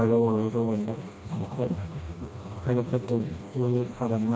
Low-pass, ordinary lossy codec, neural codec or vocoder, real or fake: none; none; codec, 16 kHz, 1 kbps, FreqCodec, smaller model; fake